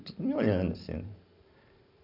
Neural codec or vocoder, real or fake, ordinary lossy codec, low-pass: none; real; none; 5.4 kHz